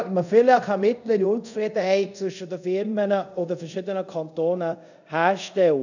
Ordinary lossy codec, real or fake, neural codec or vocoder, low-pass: none; fake; codec, 24 kHz, 0.5 kbps, DualCodec; 7.2 kHz